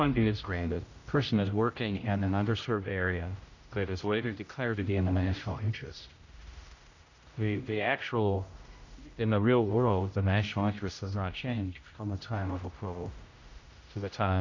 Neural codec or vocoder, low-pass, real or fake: codec, 16 kHz, 0.5 kbps, X-Codec, HuBERT features, trained on general audio; 7.2 kHz; fake